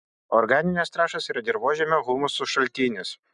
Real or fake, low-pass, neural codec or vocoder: real; 9.9 kHz; none